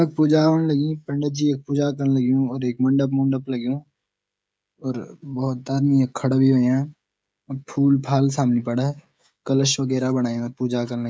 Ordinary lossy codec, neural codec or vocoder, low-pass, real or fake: none; codec, 16 kHz, 16 kbps, FreqCodec, smaller model; none; fake